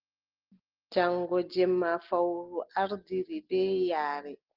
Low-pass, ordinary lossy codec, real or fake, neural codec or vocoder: 5.4 kHz; Opus, 16 kbps; real; none